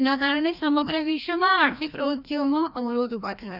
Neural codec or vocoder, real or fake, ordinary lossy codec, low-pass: codec, 16 kHz, 1 kbps, FreqCodec, larger model; fake; none; 5.4 kHz